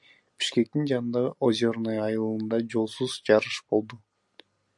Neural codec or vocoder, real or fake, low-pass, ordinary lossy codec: none; real; 10.8 kHz; MP3, 64 kbps